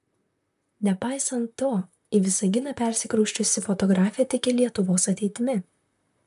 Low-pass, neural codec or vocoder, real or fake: 14.4 kHz; vocoder, 44.1 kHz, 128 mel bands, Pupu-Vocoder; fake